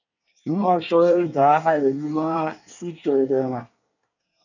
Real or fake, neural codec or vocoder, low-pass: fake; codec, 24 kHz, 1 kbps, SNAC; 7.2 kHz